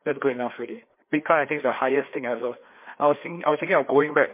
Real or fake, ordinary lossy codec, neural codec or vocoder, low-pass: fake; MP3, 24 kbps; codec, 16 kHz, 2 kbps, FreqCodec, larger model; 3.6 kHz